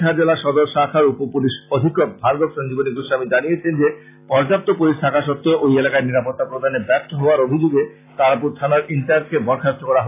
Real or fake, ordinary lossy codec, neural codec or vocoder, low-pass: real; AAC, 24 kbps; none; 3.6 kHz